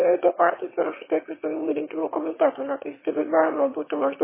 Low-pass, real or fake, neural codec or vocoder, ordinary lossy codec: 3.6 kHz; fake; autoencoder, 22.05 kHz, a latent of 192 numbers a frame, VITS, trained on one speaker; MP3, 16 kbps